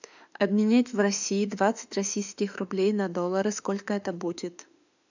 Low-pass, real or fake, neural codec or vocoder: 7.2 kHz; fake; autoencoder, 48 kHz, 32 numbers a frame, DAC-VAE, trained on Japanese speech